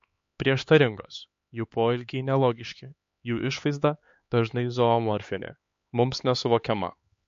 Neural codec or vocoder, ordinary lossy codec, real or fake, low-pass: codec, 16 kHz, 4 kbps, X-Codec, HuBERT features, trained on LibriSpeech; MP3, 48 kbps; fake; 7.2 kHz